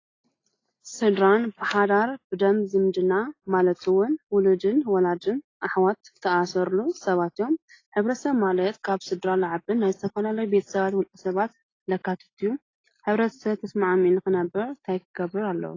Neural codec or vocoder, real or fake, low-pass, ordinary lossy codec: none; real; 7.2 kHz; AAC, 32 kbps